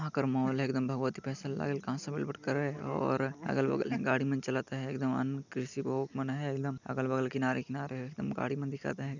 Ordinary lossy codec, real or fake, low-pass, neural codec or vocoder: none; real; 7.2 kHz; none